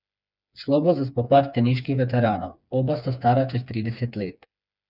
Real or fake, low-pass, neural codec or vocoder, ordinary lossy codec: fake; 5.4 kHz; codec, 16 kHz, 4 kbps, FreqCodec, smaller model; none